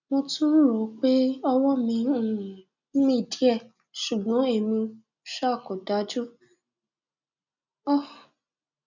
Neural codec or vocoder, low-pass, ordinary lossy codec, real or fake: none; 7.2 kHz; none; real